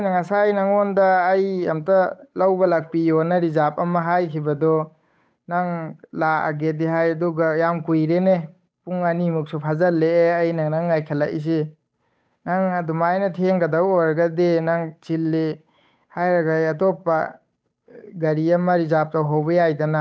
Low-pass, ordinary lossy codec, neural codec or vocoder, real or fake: none; none; codec, 16 kHz, 8 kbps, FunCodec, trained on Chinese and English, 25 frames a second; fake